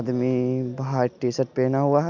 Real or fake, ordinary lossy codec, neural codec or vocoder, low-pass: real; none; none; 7.2 kHz